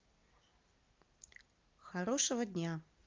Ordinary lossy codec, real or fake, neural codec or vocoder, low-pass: Opus, 32 kbps; real; none; 7.2 kHz